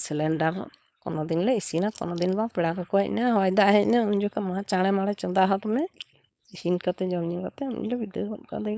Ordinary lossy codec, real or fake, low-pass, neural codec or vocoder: none; fake; none; codec, 16 kHz, 4.8 kbps, FACodec